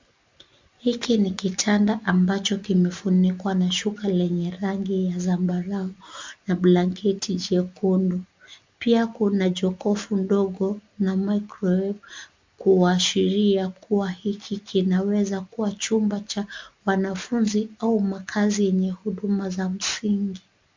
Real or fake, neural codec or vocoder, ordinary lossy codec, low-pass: real; none; MP3, 64 kbps; 7.2 kHz